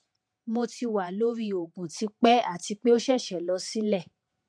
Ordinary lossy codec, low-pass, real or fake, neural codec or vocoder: MP3, 64 kbps; 9.9 kHz; fake; vocoder, 48 kHz, 128 mel bands, Vocos